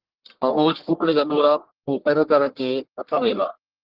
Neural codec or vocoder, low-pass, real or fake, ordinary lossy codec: codec, 44.1 kHz, 1.7 kbps, Pupu-Codec; 5.4 kHz; fake; Opus, 16 kbps